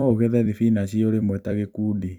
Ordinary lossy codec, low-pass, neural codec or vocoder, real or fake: none; 19.8 kHz; vocoder, 44.1 kHz, 128 mel bands every 256 samples, BigVGAN v2; fake